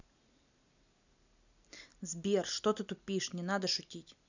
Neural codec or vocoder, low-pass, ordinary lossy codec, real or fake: none; 7.2 kHz; none; real